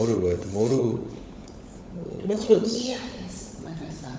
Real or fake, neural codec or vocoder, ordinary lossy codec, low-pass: fake; codec, 16 kHz, 16 kbps, FunCodec, trained on LibriTTS, 50 frames a second; none; none